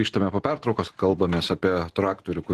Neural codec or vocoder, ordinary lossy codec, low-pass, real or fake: none; Opus, 16 kbps; 14.4 kHz; real